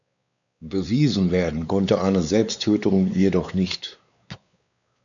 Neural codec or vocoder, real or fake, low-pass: codec, 16 kHz, 4 kbps, X-Codec, WavLM features, trained on Multilingual LibriSpeech; fake; 7.2 kHz